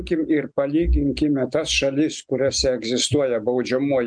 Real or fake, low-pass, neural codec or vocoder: real; 9.9 kHz; none